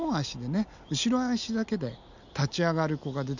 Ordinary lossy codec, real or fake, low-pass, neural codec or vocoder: none; real; 7.2 kHz; none